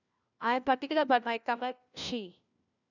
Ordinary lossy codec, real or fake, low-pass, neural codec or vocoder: none; fake; 7.2 kHz; codec, 16 kHz, 1 kbps, FunCodec, trained on LibriTTS, 50 frames a second